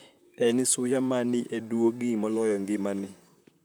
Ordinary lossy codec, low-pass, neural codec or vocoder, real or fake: none; none; vocoder, 44.1 kHz, 128 mel bands, Pupu-Vocoder; fake